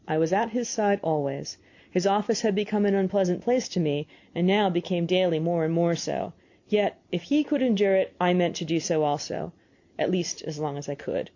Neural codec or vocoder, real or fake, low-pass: none; real; 7.2 kHz